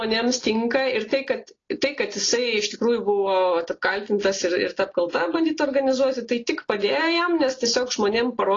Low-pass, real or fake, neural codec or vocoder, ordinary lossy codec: 7.2 kHz; real; none; AAC, 32 kbps